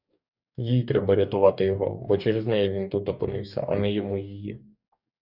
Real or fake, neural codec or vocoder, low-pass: fake; codec, 44.1 kHz, 2.6 kbps, DAC; 5.4 kHz